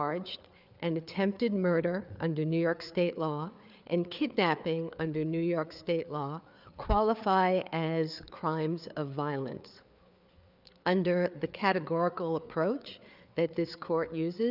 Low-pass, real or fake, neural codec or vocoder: 5.4 kHz; fake; codec, 16 kHz, 4 kbps, FreqCodec, larger model